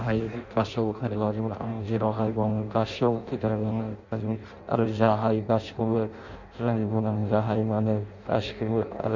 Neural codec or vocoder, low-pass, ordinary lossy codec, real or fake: codec, 16 kHz in and 24 kHz out, 0.6 kbps, FireRedTTS-2 codec; 7.2 kHz; none; fake